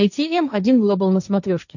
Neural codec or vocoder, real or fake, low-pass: codec, 16 kHz, 1.1 kbps, Voila-Tokenizer; fake; 7.2 kHz